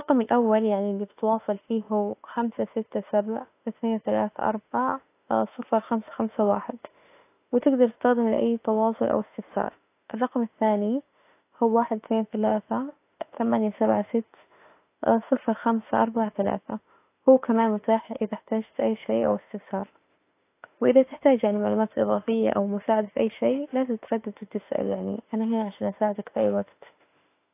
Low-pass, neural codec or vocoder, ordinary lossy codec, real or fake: 3.6 kHz; autoencoder, 48 kHz, 32 numbers a frame, DAC-VAE, trained on Japanese speech; AAC, 24 kbps; fake